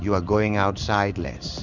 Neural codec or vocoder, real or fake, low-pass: codec, 16 kHz in and 24 kHz out, 1 kbps, XY-Tokenizer; fake; 7.2 kHz